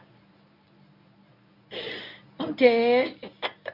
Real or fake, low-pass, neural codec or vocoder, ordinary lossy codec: fake; 5.4 kHz; codec, 24 kHz, 0.9 kbps, WavTokenizer, medium speech release version 1; none